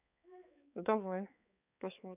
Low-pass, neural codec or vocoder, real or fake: 3.6 kHz; codec, 16 kHz, 4 kbps, X-Codec, HuBERT features, trained on balanced general audio; fake